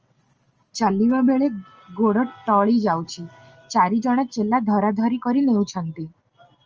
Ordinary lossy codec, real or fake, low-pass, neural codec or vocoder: Opus, 24 kbps; real; 7.2 kHz; none